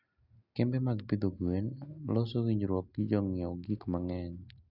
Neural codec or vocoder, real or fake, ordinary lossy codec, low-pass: none; real; none; 5.4 kHz